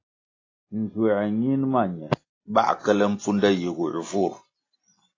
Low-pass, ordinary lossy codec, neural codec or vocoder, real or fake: 7.2 kHz; AAC, 32 kbps; none; real